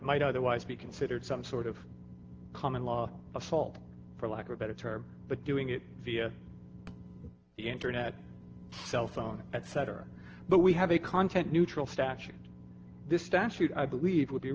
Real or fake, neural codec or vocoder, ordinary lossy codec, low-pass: real; none; Opus, 16 kbps; 7.2 kHz